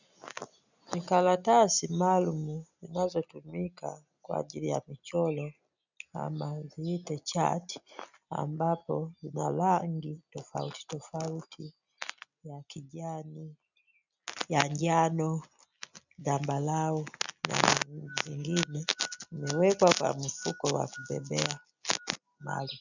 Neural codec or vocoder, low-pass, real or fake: none; 7.2 kHz; real